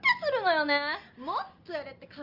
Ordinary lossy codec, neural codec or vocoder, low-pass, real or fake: Opus, 32 kbps; none; 5.4 kHz; real